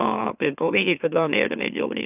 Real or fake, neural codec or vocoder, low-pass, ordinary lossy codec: fake; autoencoder, 44.1 kHz, a latent of 192 numbers a frame, MeloTTS; 3.6 kHz; none